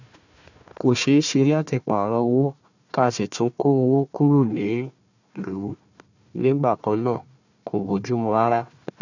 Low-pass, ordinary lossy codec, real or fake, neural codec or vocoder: 7.2 kHz; none; fake; codec, 16 kHz, 1 kbps, FunCodec, trained on Chinese and English, 50 frames a second